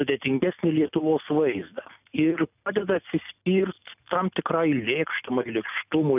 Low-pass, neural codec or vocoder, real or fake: 3.6 kHz; none; real